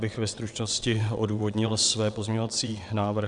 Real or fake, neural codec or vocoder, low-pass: fake; vocoder, 22.05 kHz, 80 mel bands, WaveNeXt; 9.9 kHz